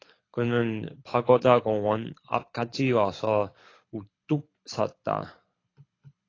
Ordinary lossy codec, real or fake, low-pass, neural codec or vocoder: AAC, 32 kbps; fake; 7.2 kHz; codec, 24 kHz, 6 kbps, HILCodec